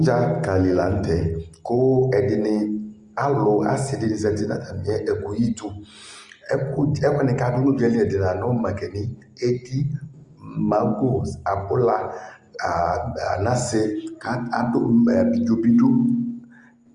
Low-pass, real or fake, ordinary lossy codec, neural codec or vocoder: 10.8 kHz; real; Opus, 32 kbps; none